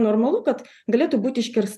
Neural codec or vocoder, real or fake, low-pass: none; real; 14.4 kHz